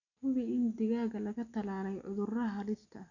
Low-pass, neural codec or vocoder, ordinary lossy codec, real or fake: 7.2 kHz; none; none; real